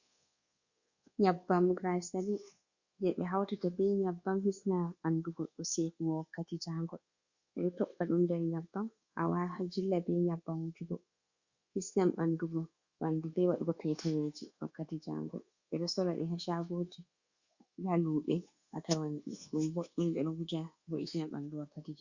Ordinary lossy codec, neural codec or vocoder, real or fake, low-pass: Opus, 64 kbps; codec, 24 kHz, 1.2 kbps, DualCodec; fake; 7.2 kHz